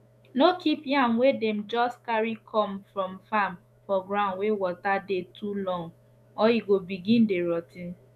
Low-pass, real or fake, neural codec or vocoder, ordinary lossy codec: 14.4 kHz; fake; autoencoder, 48 kHz, 128 numbers a frame, DAC-VAE, trained on Japanese speech; none